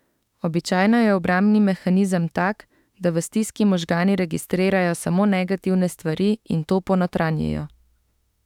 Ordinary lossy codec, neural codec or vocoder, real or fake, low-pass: none; autoencoder, 48 kHz, 32 numbers a frame, DAC-VAE, trained on Japanese speech; fake; 19.8 kHz